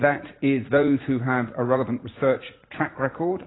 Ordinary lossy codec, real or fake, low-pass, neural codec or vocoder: AAC, 16 kbps; fake; 7.2 kHz; vocoder, 44.1 kHz, 80 mel bands, Vocos